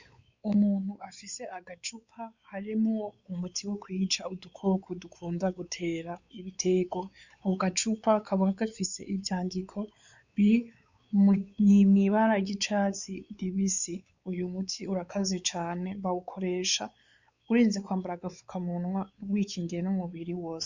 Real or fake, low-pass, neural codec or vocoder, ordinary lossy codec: fake; 7.2 kHz; codec, 16 kHz, 4 kbps, X-Codec, WavLM features, trained on Multilingual LibriSpeech; Opus, 64 kbps